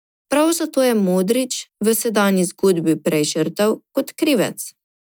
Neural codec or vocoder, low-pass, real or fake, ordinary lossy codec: none; none; real; none